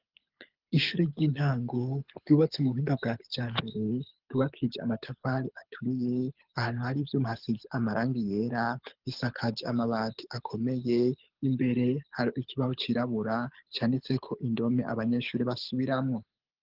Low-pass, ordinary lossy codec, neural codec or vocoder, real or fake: 5.4 kHz; Opus, 32 kbps; codec, 24 kHz, 6 kbps, HILCodec; fake